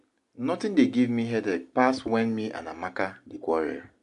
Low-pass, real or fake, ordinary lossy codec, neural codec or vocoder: 10.8 kHz; real; AAC, 64 kbps; none